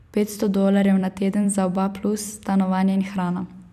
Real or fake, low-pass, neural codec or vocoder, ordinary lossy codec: fake; 14.4 kHz; vocoder, 44.1 kHz, 128 mel bands every 256 samples, BigVGAN v2; none